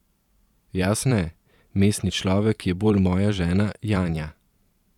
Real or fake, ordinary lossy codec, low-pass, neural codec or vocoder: fake; none; 19.8 kHz; vocoder, 44.1 kHz, 128 mel bands every 512 samples, BigVGAN v2